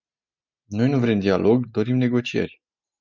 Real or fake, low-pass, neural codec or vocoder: real; 7.2 kHz; none